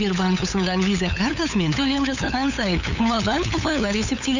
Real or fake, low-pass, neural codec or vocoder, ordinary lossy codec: fake; 7.2 kHz; codec, 16 kHz, 8 kbps, FunCodec, trained on LibriTTS, 25 frames a second; none